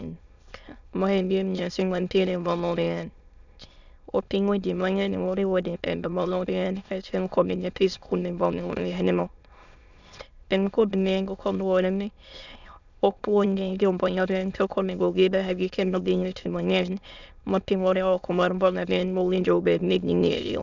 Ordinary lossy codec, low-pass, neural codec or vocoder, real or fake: none; 7.2 kHz; autoencoder, 22.05 kHz, a latent of 192 numbers a frame, VITS, trained on many speakers; fake